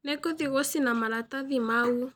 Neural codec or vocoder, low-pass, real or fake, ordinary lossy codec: none; none; real; none